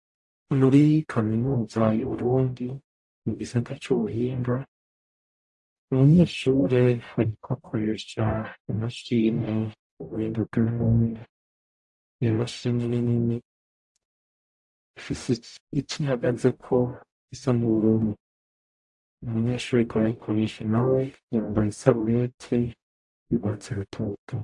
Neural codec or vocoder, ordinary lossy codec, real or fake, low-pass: codec, 44.1 kHz, 0.9 kbps, DAC; AAC, 64 kbps; fake; 10.8 kHz